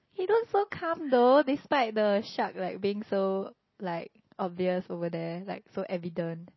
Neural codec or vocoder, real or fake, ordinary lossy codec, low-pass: none; real; MP3, 24 kbps; 7.2 kHz